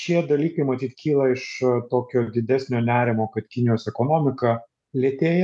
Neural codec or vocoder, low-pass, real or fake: none; 10.8 kHz; real